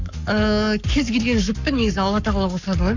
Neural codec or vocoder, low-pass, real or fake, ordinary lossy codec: codec, 44.1 kHz, 7.8 kbps, Pupu-Codec; 7.2 kHz; fake; none